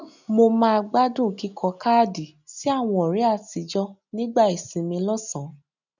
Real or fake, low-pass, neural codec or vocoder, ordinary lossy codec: real; 7.2 kHz; none; none